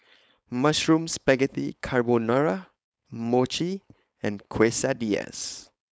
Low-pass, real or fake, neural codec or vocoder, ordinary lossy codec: none; fake; codec, 16 kHz, 4.8 kbps, FACodec; none